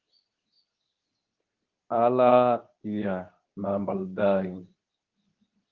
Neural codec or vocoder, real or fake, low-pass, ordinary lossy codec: codec, 24 kHz, 0.9 kbps, WavTokenizer, medium speech release version 2; fake; 7.2 kHz; Opus, 32 kbps